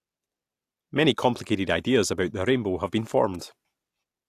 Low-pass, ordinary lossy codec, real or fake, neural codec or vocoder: 14.4 kHz; AAC, 64 kbps; real; none